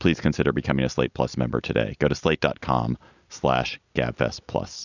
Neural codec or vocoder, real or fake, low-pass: none; real; 7.2 kHz